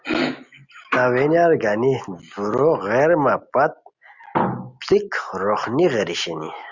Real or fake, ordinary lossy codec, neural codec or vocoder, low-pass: real; Opus, 64 kbps; none; 7.2 kHz